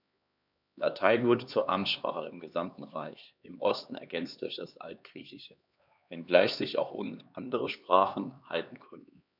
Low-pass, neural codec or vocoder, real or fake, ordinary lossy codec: 5.4 kHz; codec, 16 kHz, 2 kbps, X-Codec, HuBERT features, trained on LibriSpeech; fake; none